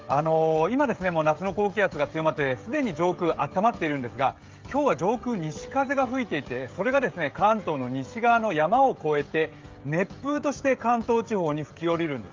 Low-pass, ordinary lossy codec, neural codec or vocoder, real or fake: 7.2 kHz; Opus, 32 kbps; codec, 16 kHz, 16 kbps, FreqCodec, smaller model; fake